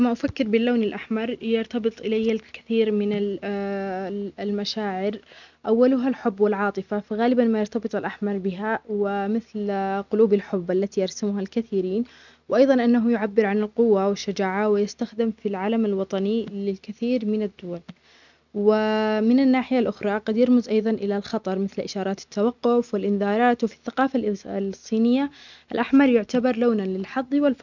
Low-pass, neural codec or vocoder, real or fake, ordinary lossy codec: 7.2 kHz; none; real; none